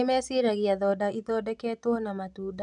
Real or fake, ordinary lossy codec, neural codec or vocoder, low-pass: real; none; none; 10.8 kHz